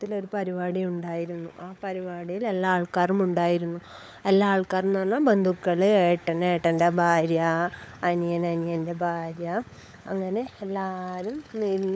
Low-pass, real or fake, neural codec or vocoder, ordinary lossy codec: none; fake; codec, 16 kHz, 16 kbps, FunCodec, trained on LibriTTS, 50 frames a second; none